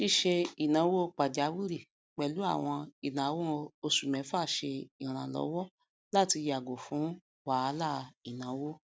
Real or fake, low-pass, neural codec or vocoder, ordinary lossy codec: real; none; none; none